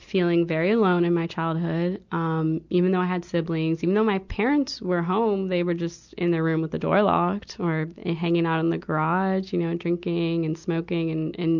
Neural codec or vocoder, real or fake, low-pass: none; real; 7.2 kHz